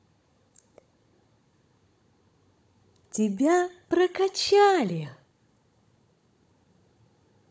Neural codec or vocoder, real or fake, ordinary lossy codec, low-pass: codec, 16 kHz, 16 kbps, FunCodec, trained on Chinese and English, 50 frames a second; fake; none; none